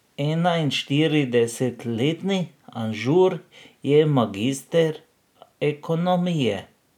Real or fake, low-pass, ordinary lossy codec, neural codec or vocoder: real; 19.8 kHz; none; none